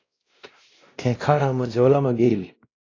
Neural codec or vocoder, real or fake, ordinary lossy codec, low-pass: codec, 16 kHz, 1 kbps, X-Codec, WavLM features, trained on Multilingual LibriSpeech; fake; AAC, 32 kbps; 7.2 kHz